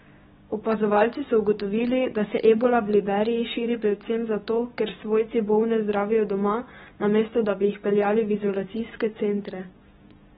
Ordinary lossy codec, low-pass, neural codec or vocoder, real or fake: AAC, 16 kbps; 7.2 kHz; codec, 16 kHz, 6 kbps, DAC; fake